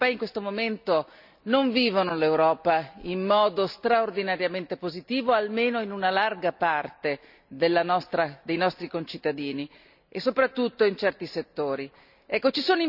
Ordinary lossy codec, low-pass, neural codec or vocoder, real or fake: none; 5.4 kHz; none; real